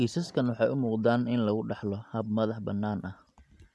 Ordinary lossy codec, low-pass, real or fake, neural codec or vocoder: none; none; real; none